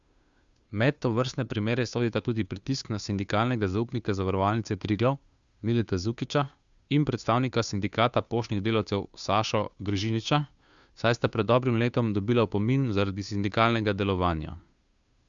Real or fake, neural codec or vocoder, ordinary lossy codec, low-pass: fake; codec, 16 kHz, 2 kbps, FunCodec, trained on Chinese and English, 25 frames a second; Opus, 64 kbps; 7.2 kHz